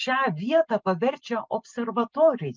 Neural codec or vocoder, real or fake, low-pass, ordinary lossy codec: none; real; 7.2 kHz; Opus, 24 kbps